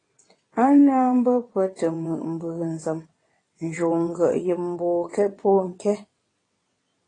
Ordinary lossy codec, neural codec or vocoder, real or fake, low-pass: AAC, 32 kbps; vocoder, 22.05 kHz, 80 mel bands, WaveNeXt; fake; 9.9 kHz